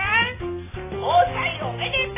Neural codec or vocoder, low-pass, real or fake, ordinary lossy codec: none; 3.6 kHz; real; AAC, 16 kbps